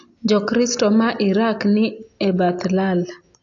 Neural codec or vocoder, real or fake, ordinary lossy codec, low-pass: none; real; AAC, 48 kbps; 7.2 kHz